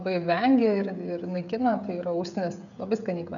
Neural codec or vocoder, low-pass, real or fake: codec, 16 kHz, 16 kbps, FreqCodec, smaller model; 7.2 kHz; fake